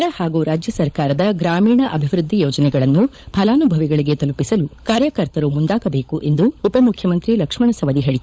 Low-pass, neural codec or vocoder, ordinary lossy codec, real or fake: none; codec, 16 kHz, 16 kbps, FunCodec, trained on LibriTTS, 50 frames a second; none; fake